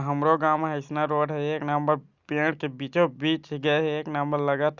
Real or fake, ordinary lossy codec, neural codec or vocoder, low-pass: real; Opus, 64 kbps; none; 7.2 kHz